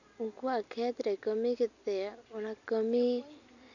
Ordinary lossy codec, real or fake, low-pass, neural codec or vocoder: none; real; 7.2 kHz; none